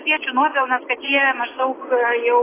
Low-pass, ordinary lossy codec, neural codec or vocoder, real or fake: 3.6 kHz; AAC, 16 kbps; none; real